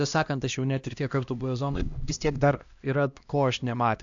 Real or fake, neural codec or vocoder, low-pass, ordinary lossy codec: fake; codec, 16 kHz, 1 kbps, X-Codec, HuBERT features, trained on LibriSpeech; 7.2 kHz; AAC, 64 kbps